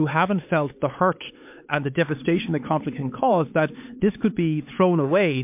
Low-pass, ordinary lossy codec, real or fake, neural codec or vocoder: 3.6 kHz; MP3, 32 kbps; fake; codec, 16 kHz, 4 kbps, X-Codec, HuBERT features, trained on LibriSpeech